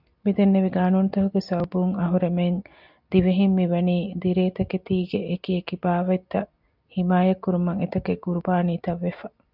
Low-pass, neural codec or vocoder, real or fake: 5.4 kHz; none; real